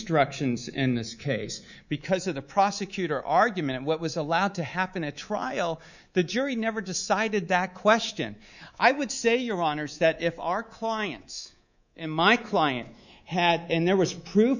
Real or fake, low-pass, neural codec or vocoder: fake; 7.2 kHz; codec, 24 kHz, 3.1 kbps, DualCodec